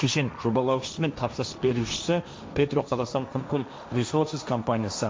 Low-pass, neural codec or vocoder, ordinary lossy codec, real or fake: none; codec, 16 kHz, 1.1 kbps, Voila-Tokenizer; none; fake